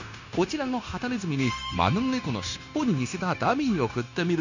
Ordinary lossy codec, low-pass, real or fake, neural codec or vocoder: none; 7.2 kHz; fake; codec, 16 kHz, 0.9 kbps, LongCat-Audio-Codec